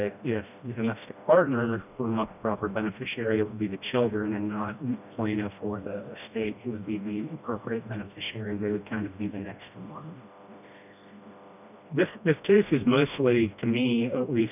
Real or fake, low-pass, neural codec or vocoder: fake; 3.6 kHz; codec, 16 kHz, 1 kbps, FreqCodec, smaller model